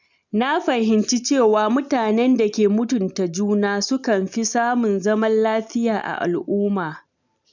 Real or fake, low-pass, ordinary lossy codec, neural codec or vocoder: real; 7.2 kHz; none; none